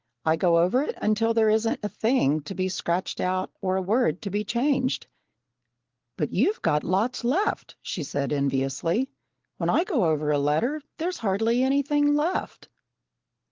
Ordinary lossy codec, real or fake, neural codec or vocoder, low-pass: Opus, 16 kbps; real; none; 7.2 kHz